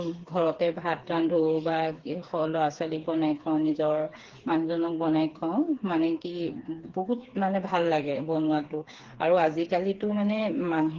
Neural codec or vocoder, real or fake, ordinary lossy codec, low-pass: codec, 16 kHz, 4 kbps, FreqCodec, smaller model; fake; Opus, 16 kbps; 7.2 kHz